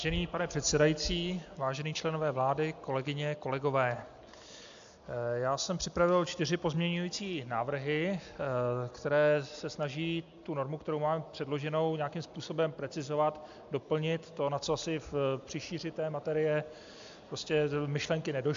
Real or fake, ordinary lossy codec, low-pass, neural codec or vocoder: real; AAC, 64 kbps; 7.2 kHz; none